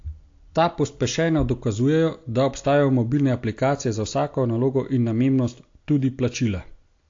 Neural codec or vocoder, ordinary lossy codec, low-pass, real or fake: none; AAC, 48 kbps; 7.2 kHz; real